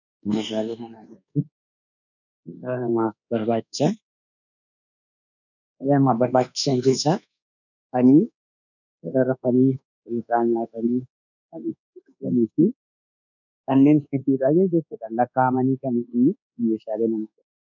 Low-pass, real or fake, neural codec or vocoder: 7.2 kHz; fake; codec, 24 kHz, 1.2 kbps, DualCodec